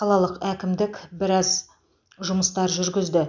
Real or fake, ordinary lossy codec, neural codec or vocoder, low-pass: real; none; none; 7.2 kHz